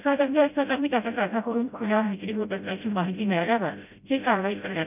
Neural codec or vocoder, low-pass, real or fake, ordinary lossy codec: codec, 16 kHz, 0.5 kbps, FreqCodec, smaller model; 3.6 kHz; fake; none